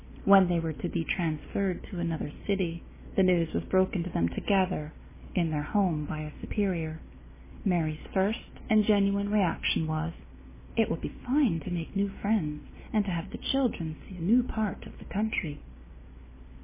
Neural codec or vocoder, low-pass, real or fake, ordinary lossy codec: none; 3.6 kHz; real; MP3, 16 kbps